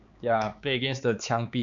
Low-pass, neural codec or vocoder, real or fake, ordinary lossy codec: 7.2 kHz; codec, 16 kHz, 4 kbps, X-Codec, HuBERT features, trained on balanced general audio; fake; MP3, 96 kbps